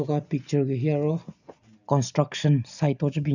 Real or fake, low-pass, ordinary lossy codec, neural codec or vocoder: real; 7.2 kHz; none; none